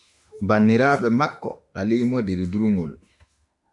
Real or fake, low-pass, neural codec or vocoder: fake; 10.8 kHz; autoencoder, 48 kHz, 32 numbers a frame, DAC-VAE, trained on Japanese speech